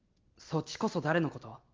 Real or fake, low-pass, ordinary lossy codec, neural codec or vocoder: real; 7.2 kHz; Opus, 32 kbps; none